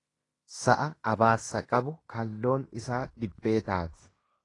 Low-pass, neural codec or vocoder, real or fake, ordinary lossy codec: 10.8 kHz; codec, 16 kHz in and 24 kHz out, 0.9 kbps, LongCat-Audio-Codec, fine tuned four codebook decoder; fake; AAC, 32 kbps